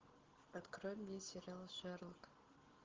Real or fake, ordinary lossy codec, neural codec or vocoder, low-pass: real; Opus, 16 kbps; none; 7.2 kHz